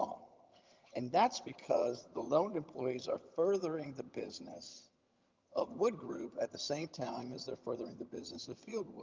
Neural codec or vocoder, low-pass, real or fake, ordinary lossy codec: vocoder, 22.05 kHz, 80 mel bands, HiFi-GAN; 7.2 kHz; fake; Opus, 24 kbps